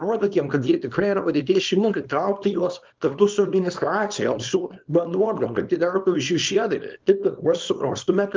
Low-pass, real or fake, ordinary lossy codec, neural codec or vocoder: 7.2 kHz; fake; Opus, 24 kbps; codec, 24 kHz, 0.9 kbps, WavTokenizer, small release